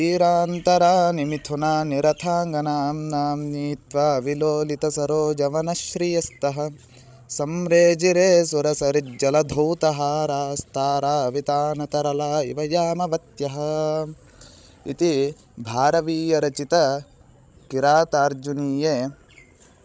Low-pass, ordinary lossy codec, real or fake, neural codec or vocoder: none; none; fake; codec, 16 kHz, 16 kbps, FreqCodec, larger model